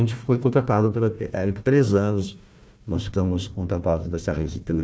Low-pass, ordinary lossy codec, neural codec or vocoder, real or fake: none; none; codec, 16 kHz, 1 kbps, FunCodec, trained on Chinese and English, 50 frames a second; fake